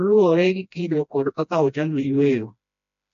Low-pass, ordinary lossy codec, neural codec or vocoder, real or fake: 7.2 kHz; AAC, 64 kbps; codec, 16 kHz, 1 kbps, FreqCodec, smaller model; fake